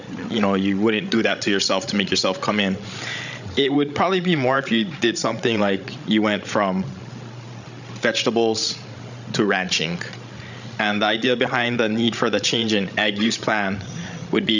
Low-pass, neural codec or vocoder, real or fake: 7.2 kHz; codec, 16 kHz, 16 kbps, FreqCodec, larger model; fake